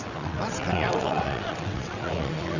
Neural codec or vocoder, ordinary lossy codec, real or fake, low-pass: vocoder, 22.05 kHz, 80 mel bands, WaveNeXt; none; fake; 7.2 kHz